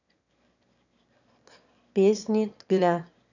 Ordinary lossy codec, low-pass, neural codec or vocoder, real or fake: none; 7.2 kHz; autoencoder, 22.05 kHz, a latent of 192 numbers a frame, VITS, trained on one speaker; fake